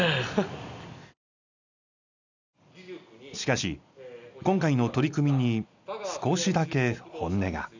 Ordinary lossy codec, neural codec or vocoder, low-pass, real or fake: none; none; 7.2 kHz; real